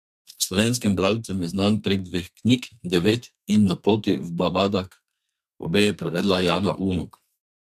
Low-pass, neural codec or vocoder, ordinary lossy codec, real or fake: 14.4 kHz; codec, 32 kHz, 1.9 kbps, SNAC; none; fake